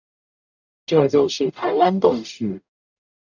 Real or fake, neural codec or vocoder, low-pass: fake; codec, 44.1 kHz, 0.9 kbps, DAC; 7.2 kHz